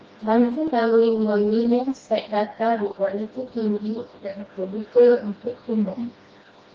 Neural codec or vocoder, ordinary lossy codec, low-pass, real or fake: codec, 16 kHz, 1 kbps, FreqCodec, smaller model; Opus, 24 kbps; 7.2 kHz; fake